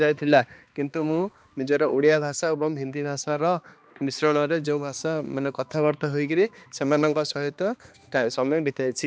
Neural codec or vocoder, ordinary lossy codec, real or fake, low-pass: codec, 16 kHz, 2 kbps, X-Codec, HuBERT features, trained on balanced general audio; none; fake; none